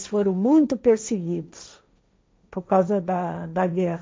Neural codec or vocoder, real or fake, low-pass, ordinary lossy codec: codec, 16 kHz, 1.1 kbps, Voila-Tokenizer; fake; none; none